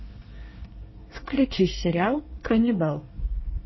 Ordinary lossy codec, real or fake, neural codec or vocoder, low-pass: MP3, 24 kbps; fake; codec, 24 kHz, 1 kbps, SNAC; 7.2 kHz